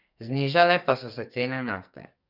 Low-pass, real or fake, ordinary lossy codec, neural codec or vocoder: 5.4 kHz; fake; none; codec, 44.1 kHz, 2.6 kbps, SNAC